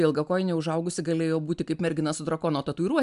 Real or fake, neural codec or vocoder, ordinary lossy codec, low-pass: real; none; MP3, 96 kbps; 10.8 kHz